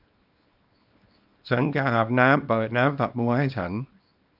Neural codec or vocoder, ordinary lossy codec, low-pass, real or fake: codec, 24 kHz, 0.9 kbps, WavTokenizer, small release; none; 5.4 kHz; fake